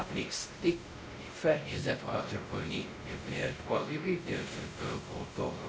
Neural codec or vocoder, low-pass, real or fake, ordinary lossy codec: codec, 16 kHz, 0.5 kbps, X-Codec, WavLM features, trained on Multilingual LibriSpeech; none; fake; none